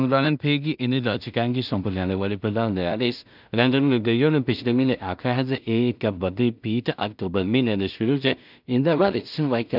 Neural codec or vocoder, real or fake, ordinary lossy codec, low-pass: codec, 16 kHz in and 24 kHz out, 0.4 kbps, LongCat-Audio-Codec, two codebook decoder; fake; none; 5.4 kHz